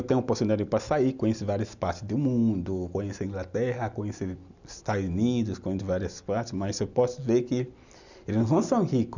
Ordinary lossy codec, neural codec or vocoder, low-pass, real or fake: none; none; 7.2 kHz; real